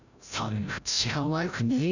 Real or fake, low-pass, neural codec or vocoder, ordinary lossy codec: fake; 7.2 kHz; codec, 16 kHz, 0.5 kbps, FreqCodec, larger model; none